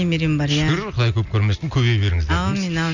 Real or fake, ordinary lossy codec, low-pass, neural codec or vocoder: real; none; 7.2 kHz; none